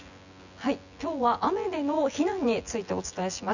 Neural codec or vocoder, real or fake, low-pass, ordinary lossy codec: vocoder, 24 kHz, 100 mel bands, Vocos; fake; 7.2 kHz; none